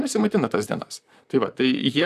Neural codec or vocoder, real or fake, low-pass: vocoder, 44.1 kHz, 128 mel bands, Pupu-Vocoder; fake; 14.4 kHz